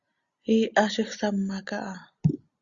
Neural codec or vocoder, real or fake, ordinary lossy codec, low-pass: none; real; Opus, 64 kbps; 7.2 kHz